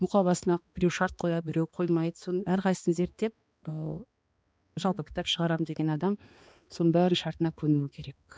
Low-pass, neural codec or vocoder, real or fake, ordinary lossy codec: none; codec, 16 kHz, 2 kbps, X-Codec, HuBERT features, trained on balanced general audio; fake; none